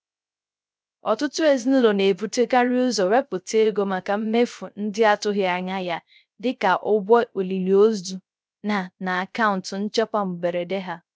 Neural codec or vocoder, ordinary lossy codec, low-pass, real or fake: codec, 16 kHz, 0.3 kbps, FocalCodec; none; none; fake